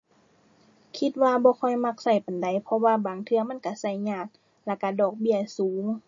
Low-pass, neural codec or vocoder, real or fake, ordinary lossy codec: 7.2 kHz; none; real; MP3, 32 kbps